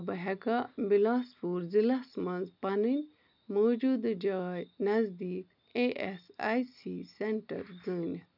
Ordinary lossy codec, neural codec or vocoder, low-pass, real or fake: none; none; 5.4 kHz; real